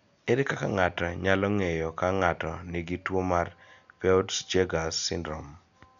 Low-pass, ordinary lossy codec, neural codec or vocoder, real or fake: 7.2 kHz; none; none; real